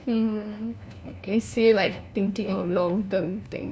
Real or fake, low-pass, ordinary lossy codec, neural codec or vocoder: fake; none; none; codec, 16 kHz, 1 kbps, FunCodec, trained on LibriTTS, 50 frames a second